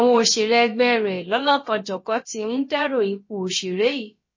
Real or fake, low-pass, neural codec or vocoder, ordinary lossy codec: fake; 7.2 kHz; codec, 16 kHz, about 1 kbps, DyCAST, with the encoder's durations; MP3, 32 kbps